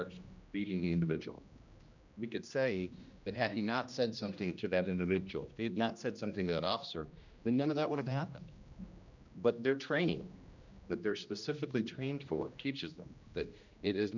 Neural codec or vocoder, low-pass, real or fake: codec, 16 kHz, 1 kbps, X-Codec, HuBERT features, trained on general audio; 7.2 kHz; fake